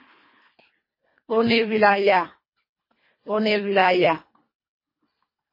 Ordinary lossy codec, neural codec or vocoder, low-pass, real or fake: MP3, 24 kbps; codec, 24 kHz, 1.5 kbps, HILCodec; 5.4 kHz; fake